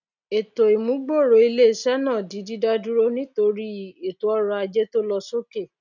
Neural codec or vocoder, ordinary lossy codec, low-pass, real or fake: none; none; 7.2 kHz; real